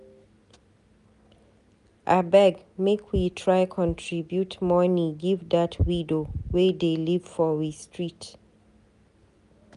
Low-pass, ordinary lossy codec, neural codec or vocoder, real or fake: 10.8 kHz; none; none; real